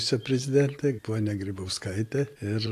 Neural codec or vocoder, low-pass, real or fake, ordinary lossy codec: none; 14.4 kHz; real; AAC, 64 kbps